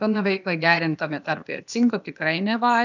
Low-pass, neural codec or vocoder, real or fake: 7.2 kHz; codec, 16 kHz, 0.8 kbps, ZipCodec; fake